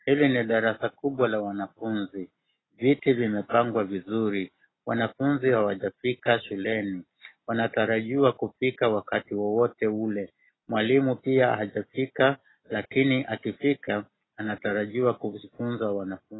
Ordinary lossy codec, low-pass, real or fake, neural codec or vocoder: AAC, 16 kbps; 7.2 kHz; real; none